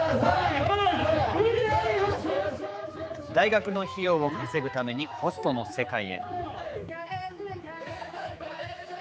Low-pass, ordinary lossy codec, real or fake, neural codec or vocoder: none; none; fake; codec, 16 kHz, 4 kbps, X-Codec, HuBERT features, trained on general audio